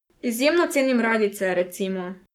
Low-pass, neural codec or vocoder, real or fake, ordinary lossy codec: 19.8 kHz; codec, 44.1 kHz, 7.8 kbps, Pupu-Codec; fake; none